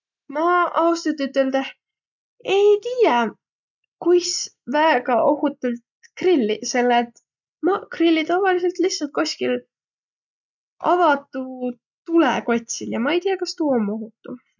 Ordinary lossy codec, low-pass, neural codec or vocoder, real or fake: none; 7.2 kHz; none; real